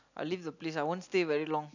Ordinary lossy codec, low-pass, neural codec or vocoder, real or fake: none; 7.2 kHz; none; real